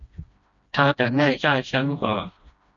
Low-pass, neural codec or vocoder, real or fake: 7.2 kHz; codec, 16 kHz, 1 kbps, FreqCodec, smaller model; fake